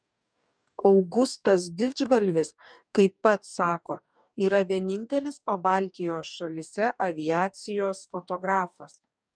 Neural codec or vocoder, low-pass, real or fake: codec, 44.1 kHz, 2.6 kbps, DAC; 9.9 kHz; fake